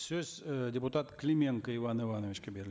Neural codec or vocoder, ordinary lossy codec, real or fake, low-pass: none; none; real; none